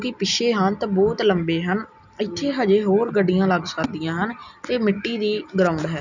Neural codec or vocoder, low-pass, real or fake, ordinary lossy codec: none; 7.2 kHz; real; none